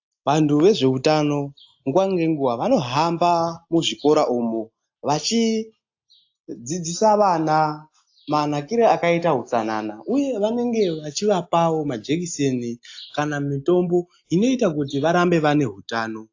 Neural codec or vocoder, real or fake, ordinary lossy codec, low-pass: none; real; AAC, 48 kbps; 7.2 kHz